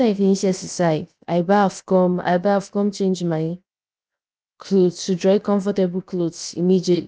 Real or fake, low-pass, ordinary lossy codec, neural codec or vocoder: fake; none; none; codec, 16 kHz, 0.7 kbps, FocalCodec